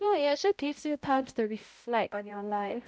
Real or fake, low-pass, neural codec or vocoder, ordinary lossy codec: fake; none; codec, 16 kHz, 0.5 kbps, X-Codec, HuBERT features, trained on balanced general audio; none